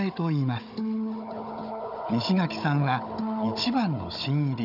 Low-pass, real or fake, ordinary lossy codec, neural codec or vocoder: 5.4 kHz; fake; AAC, 48 kbps; codec, 16 kHz, 16 kbps, FunCodec, trained on Chinese and English, 50 frames a second